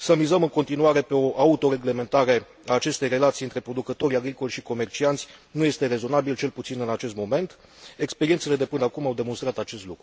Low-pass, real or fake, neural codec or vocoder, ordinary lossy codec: none; real; none; none